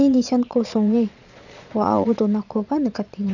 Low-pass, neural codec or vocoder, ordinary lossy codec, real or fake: 7.2 kHz; vocoder, 22.05 kHz, 80 mel bands, Vocos; none; fake